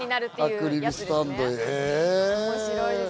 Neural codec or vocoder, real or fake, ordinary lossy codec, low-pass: none; real; none; none